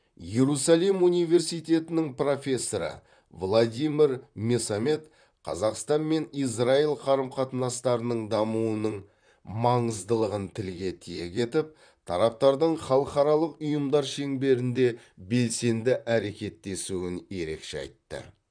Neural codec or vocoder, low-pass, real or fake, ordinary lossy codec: vocoder, 44.1 kHz, 128 mel bands, Pupu-Vocoder; 9.9 kHz; fake; none